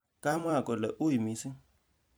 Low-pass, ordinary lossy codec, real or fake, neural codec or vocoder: none; none; fake; vocoder, 44.1 kHz, 128 mel bands every 256 samples, BigVGAN v2